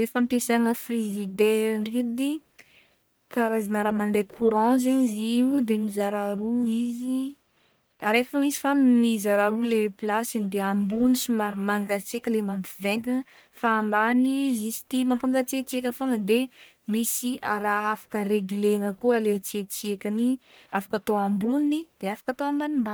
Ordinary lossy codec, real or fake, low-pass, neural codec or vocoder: none; fake; none; codec, 44.1 kHz, 1.7 kbps, Pupu-Codec